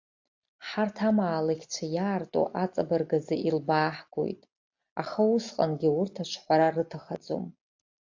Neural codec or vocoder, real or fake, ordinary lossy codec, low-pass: none; real; AAC, 48 kbps; 7.2 kHz